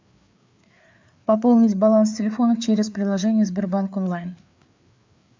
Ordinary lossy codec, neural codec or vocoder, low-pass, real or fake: MP3, 64 kbps; codec, 16 kHz, 4 kbps, FreqCodec, larger model; 7.2 kHz; fake